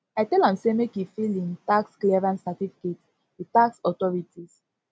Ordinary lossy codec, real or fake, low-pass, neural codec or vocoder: none; real; none; none